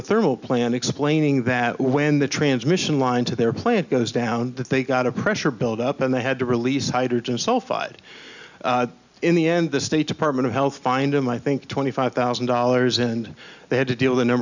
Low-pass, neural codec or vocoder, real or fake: 7.2 kHz; none; real